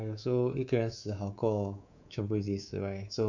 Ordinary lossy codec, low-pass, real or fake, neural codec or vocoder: none; 7.2 kHz; fake; codec, 24 kHz, 3.1 kbps, DualCodec